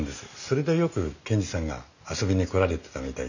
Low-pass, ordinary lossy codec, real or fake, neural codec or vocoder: 7.2 kHz; none; real; none